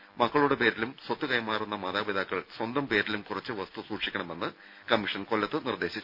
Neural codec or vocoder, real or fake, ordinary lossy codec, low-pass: none; real; none; 5.4 kHz